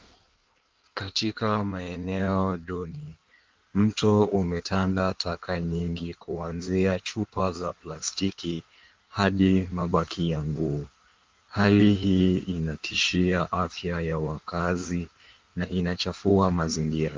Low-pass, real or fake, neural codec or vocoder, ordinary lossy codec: 7.2 kHz; fake; codec, 16 kHz in and 24 kHz out, 1.1 kbps, FireRedTTS-2 codec; Opus, 32 kbps